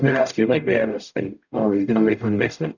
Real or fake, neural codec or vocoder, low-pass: fake; codec, 44.1 kHz, 0.9 kbps, DAC; 7.2 kHz